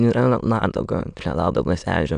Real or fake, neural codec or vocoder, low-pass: fake; autoencoder, 22.05 kHz, a latent of 192 numbers a frame, VITS, trained on many speakers; 9.9 kHz